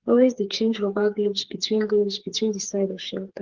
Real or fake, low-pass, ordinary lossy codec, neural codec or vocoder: fake; 7.2 kHz; Opus, 16 kbps; codec, 16 kHz, 4 kbps, FreqCodec, smaller model